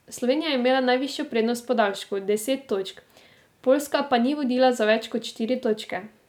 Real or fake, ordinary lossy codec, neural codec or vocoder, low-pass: real; none; none; 19.8 kHz